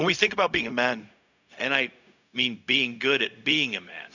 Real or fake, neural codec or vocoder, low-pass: fake; codec, 16 kHz, 0.4 kbps, LongCat-Audio-Codec; 7.2 kHz